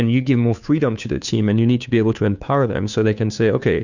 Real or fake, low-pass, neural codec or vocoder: fake; 7.2 kHz; codec, 16 kHz, 2 kbps, FunCodec, trained on Chinese and English, 25 frames a second